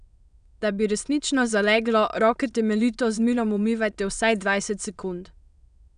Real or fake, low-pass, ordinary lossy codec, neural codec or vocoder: fake; 9.9 kHz; none; autoencoder, 22.05 kHz, a latent of 192 numbers a frame, VITS, trained on many speakers